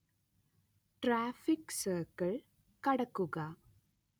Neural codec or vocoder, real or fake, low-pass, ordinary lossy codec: none; real; none; none